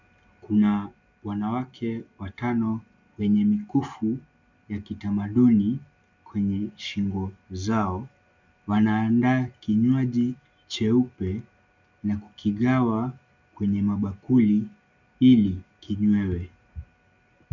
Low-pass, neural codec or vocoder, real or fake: 7.2 kHz; none; real